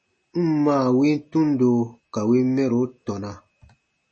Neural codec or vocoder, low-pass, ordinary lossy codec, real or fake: none; 10.8 kHz; MP3, 32 kbps; real